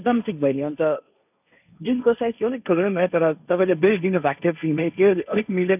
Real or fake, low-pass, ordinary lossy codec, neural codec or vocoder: fake; 3.6 kHz; none; codec, 16 kHz, 1.1 kbps, Voila-Tokenizer